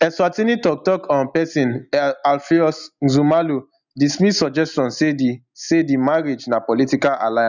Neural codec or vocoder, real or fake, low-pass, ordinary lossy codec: none; real; 7.2 kHz; none